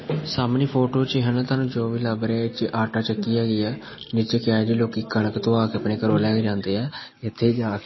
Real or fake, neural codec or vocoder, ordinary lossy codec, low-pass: real; none; MP3, 24 kbps; 7.2 kHz